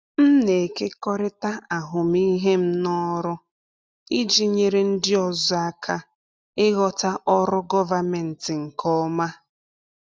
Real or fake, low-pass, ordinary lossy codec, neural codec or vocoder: real; none; none; none